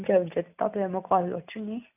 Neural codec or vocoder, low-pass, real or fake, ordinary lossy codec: none; 3.6 kHz; real; none